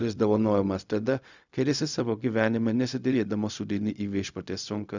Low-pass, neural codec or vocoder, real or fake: 7.2 kHz; codec, 16 kHz, 0.4 kbps, LongCat-Audio-Codec; fake